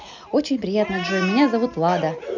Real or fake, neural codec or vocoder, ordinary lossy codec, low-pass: real; none; none; 7.2 kHz